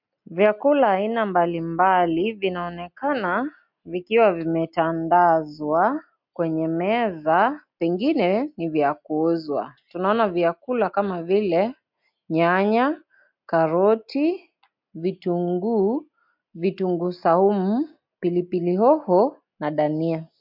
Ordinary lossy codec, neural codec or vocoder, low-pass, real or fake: MP3, 48 kbps; none; 5.4 kHz; real